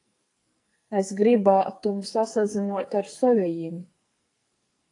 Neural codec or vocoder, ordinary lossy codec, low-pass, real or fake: codec, 44.1 kHz, 2.6 kbps, SNAC; AAC, 48 kbps; 10.8 kHz; fake